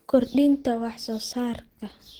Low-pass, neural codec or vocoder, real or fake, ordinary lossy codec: 19.8 kHz; none; real; Opus, 16 kbps